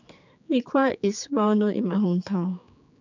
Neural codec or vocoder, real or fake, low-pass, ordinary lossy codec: codec, 16 kHz, 4 kbps, X-Codec, HuBERT features, trained on general audio; fake; 7.2 kHz; none